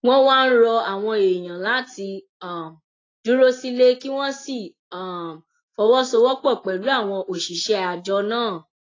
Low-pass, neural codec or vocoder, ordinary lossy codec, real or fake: 7.2 kHz; none; AAC, 32 kbps; real